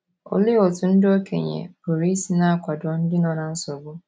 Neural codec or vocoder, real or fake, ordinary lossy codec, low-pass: none; real; none; none